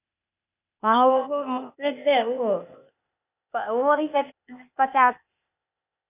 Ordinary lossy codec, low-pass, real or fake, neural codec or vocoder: none; 3.6 kHz; fake; codec, 16 kHz, 0.8 kbps, ZipCodec